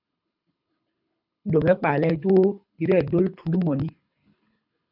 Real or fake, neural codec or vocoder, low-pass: fake; codec, 24 kHz, 6 kbps, HILCodec; 5.4 kHz